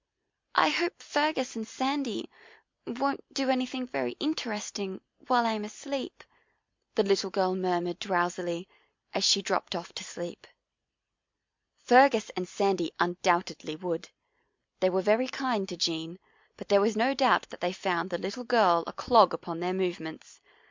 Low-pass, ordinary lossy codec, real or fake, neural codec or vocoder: 7.2 kHz; MP3, 64 kbps; real; none